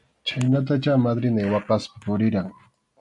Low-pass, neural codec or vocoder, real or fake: 10.8 kHz; none; real